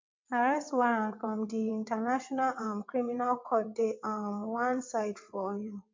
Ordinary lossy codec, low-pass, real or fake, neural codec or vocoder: MP3, 48 kbps; 7.2 kHz; fake; vocoder, 22.05 kHz, 80 mel bands, WaveNeXt